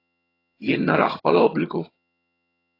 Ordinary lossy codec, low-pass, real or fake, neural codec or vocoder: AAC, 24 kbps; 5.4 kHz; fake; vocoder, 22.05 kHz, 80 mel bands, HiFi-GAN